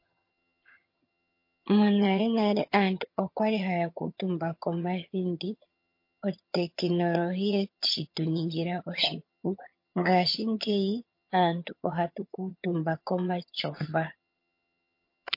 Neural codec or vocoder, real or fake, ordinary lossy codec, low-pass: vocoder, 22.05 kHz, 80 mel bands, HiFi-GAN; fake; MP3, 32 kbps; 5.4 kHz